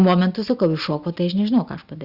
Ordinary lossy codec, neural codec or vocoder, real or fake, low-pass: Opus, 64 kbps; none; real; 5.4 kHz